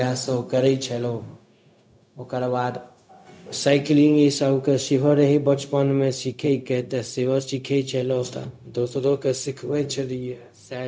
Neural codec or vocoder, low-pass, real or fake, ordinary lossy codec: codec, 16 kHz, 0.4 kbps, LongCat-Audio-Codec; none; fake; none